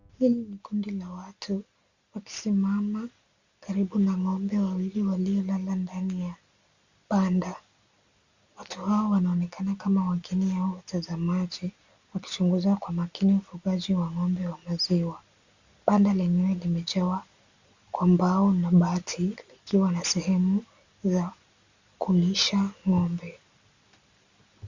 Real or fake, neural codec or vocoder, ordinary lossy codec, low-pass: real; none; Opus, 64 kbps; 7.2 kHz